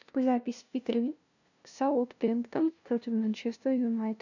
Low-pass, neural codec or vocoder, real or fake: 7.2 kHz; codec, 16 kHz, 0.5 kbps, FunCodec, trained on LibriTTS, 25 frames a second; fake